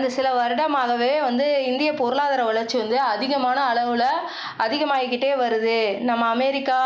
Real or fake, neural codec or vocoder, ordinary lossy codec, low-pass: real; none; none; none